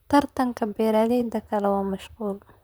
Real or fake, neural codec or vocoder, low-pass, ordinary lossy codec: fake; vocoder, 44.1 kHz, 128 mel bands, Pupu-Vocoder; none; none